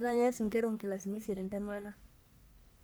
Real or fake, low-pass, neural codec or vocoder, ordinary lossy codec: fake; none; codec, 44.1 kHz, 1.7 kbps, Pupu-Codec; none